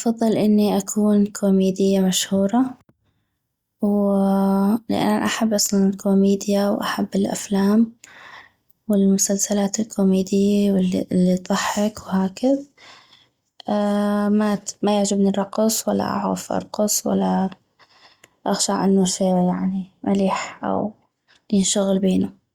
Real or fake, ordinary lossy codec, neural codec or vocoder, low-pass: real; Opus, 64 kbps; none; 19.8 kHz